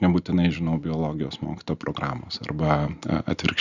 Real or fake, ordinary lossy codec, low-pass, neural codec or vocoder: real; Opus, 64 kbps; 7.2 kHz; none